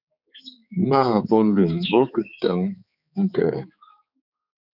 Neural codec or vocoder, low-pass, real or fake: codec, 16 kHz, 4 kbps, X-Codec, HuBERT features, trained on balanced general audio; 5.4 kHz; fake